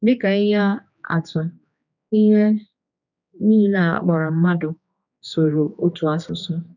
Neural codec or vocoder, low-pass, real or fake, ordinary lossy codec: codec, 16 kHz, 2 kbps, X-Codec, HuBERT features, trained on general audio; 7.2 kHz; fake; none